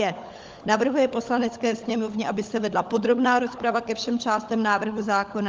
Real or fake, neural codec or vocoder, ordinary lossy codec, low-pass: fake; codec, 16 kHz, 16 kbps, FunCodec, trained on LibriTTS, 50 frames a second; Opus, 32 kbps; 7.2 kHz